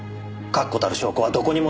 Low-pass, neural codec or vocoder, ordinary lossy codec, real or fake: none; none; none; real